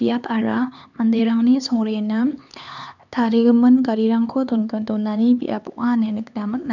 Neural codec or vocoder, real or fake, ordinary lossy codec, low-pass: codec, 16 kHz, 4 kbps, X-Codec, HuBERT features, trained on LibriSpeech; fake; none; 7.2 kHz